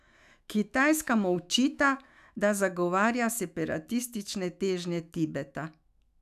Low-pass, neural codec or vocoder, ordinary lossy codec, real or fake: 14.4 kHz; autoencoder, 48 kHz, 128 numbers a frame, DAC-VAE, trained on Japanese speech; none; fake